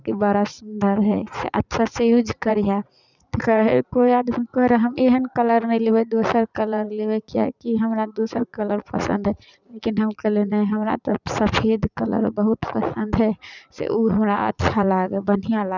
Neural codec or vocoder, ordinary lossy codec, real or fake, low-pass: vocoder, 44.1 kHz, 80 mel bands, Vocos; none; fake; 7.2 kHz